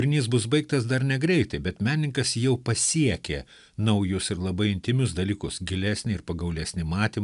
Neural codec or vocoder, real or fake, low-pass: none; real; 10.8 kHz